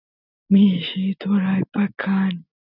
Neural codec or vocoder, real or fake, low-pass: none; real; 5.4 kHz